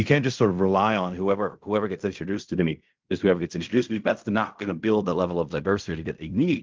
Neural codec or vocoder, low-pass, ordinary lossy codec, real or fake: codec, 16 kHz in and 24 kHz out, 0.4 kbps, LongCat-Audio-Codec, fine tuned four codebook decoder; 7.2 kHz; Opus, 32 kbps; fake